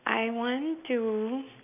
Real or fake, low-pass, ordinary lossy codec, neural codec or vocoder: real; 3.6 kHz; none; none